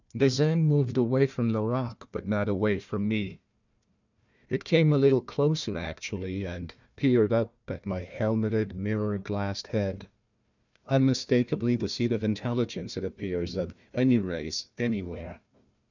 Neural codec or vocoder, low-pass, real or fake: codec, 16 kHz, 1 kbps, FunCodec, trained on Chinese and English, 50 frames a second; 7.2 kHz; fake